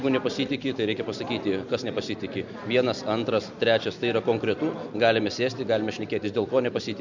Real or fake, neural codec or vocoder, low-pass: real; none; 7.2 kHz